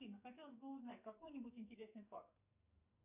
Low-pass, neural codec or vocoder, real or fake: 3.6 kHz; codec, 44.1 kHz, 2.6 kbps, SNAC; fake